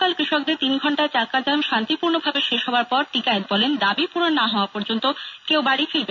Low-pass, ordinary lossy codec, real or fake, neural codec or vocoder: 7.2 kHz; none; real; none